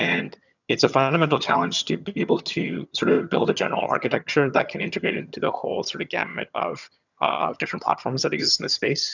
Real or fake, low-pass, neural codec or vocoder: fake; 7.2 kHz; vocoder, 22.05 kHz, 80 mel bands, HiFi-GAN